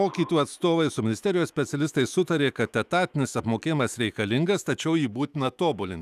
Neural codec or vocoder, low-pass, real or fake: autoencoder, 48 kHz, 128 numbers a frame, DAC-VAE, trained on Japanese speech; 14.4 kHz; fake